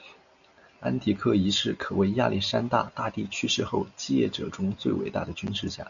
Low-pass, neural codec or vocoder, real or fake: 7.2 kHz; none; real